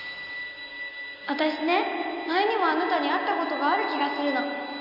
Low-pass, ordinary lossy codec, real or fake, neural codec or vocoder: 5.4 kHz; none; real; none